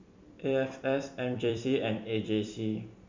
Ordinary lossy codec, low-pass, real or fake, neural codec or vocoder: MP3, 64 kbps; 7.2 kHz; fake; vocoder, 44.1 kHz, 128 mel bands every 256 samples, BigVGAN v2